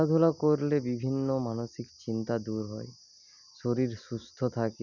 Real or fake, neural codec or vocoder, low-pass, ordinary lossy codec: real; none; 7.2 kHz; none